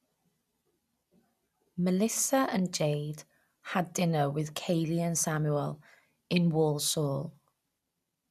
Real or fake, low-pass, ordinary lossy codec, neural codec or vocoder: real; 14.4 kHz; none; none